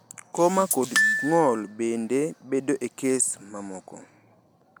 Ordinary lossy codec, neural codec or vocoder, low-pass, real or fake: none; none; none; real